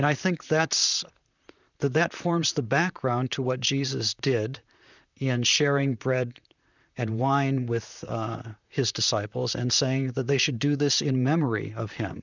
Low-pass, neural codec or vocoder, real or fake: 7.2 kHz; vocoder, 44.1 kHz, 128 mel bands, Pupu-Vocoder; fake